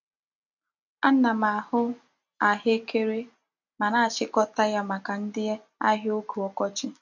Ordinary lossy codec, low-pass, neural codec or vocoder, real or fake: none; 7.2 kHz; none; real